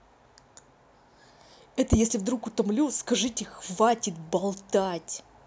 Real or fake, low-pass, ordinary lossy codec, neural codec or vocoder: real; none; none; none